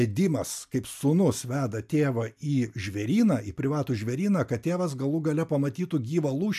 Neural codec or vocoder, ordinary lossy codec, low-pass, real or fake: none; AAC, 96 kbps; 14.4 kHz; real